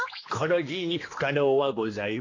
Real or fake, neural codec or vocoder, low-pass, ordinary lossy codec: fake; codec, 16 kHz, 2 kbps, X-Codec, HuBERT features, trained on general audio; 7.2 kHz; AAC, 32 kbps